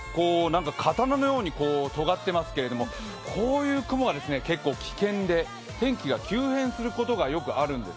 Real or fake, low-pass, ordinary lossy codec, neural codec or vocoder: real; none; none; none